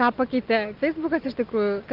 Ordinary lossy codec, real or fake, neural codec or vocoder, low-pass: Opus, 32 kbps; real; none; 5.4 kHz